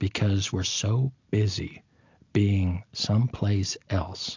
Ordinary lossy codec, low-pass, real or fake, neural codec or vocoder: AAC, 48 kbps; 7.2 kHz; real; none